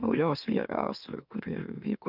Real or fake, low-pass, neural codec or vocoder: fake; 5.4 kHz; autoencoder, 44.1 kHz, a latent of 192 numbers a frame, MeloTTS